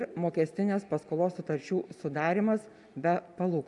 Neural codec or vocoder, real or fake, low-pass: none; real; 10.8 kHz